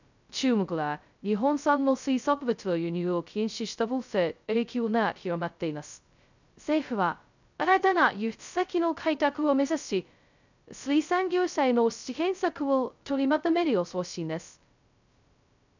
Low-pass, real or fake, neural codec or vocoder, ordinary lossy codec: 7.2 kHz; fake; codec, 16 kHz, 0.2 kbps, FocalCodec; none